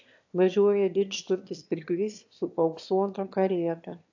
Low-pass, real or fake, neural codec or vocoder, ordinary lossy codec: 7.2 kHz; fake; autoencoder, 22.05 kHz, a latent of 192 numbers a frame, VITS, trained on one speaker; AAC, 48 kbps